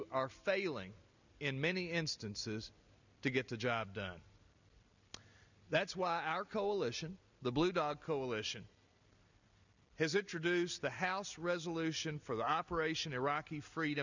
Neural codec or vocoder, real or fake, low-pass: none; real; 7.2 kHz